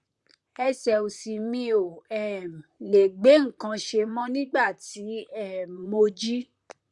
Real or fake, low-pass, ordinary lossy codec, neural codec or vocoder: fake; 10.8 kHz; Opus, 64 kbps; vocoder, 44.1 kHz, 128 mel bands, Pupu-Vocoder